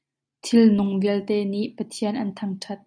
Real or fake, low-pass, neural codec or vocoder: real; 9.9 kHz; none